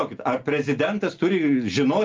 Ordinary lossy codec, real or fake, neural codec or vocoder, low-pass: Opus, 32 kbps; real; none; 7.2 kHz